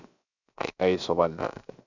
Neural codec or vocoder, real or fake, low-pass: codec, 16 kHz, 0.7 kbps, FocalCodec; fake; 7.2 kHz